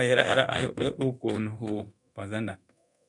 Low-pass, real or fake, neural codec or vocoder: 10.8 kHz; fake; codec, 24 kHz, 0.9 kbps, DualCodec